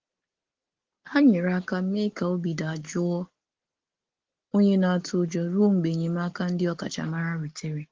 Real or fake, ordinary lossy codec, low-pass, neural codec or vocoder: real; Opus, 16 kbps; 7.2 kHz; none